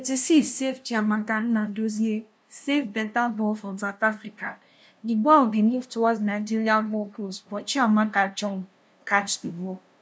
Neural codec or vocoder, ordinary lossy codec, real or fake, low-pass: codec, 16 kHz, 0.5 kbps, FunCodec, trained on LibriTTS, 25 frames a second; none; fake; none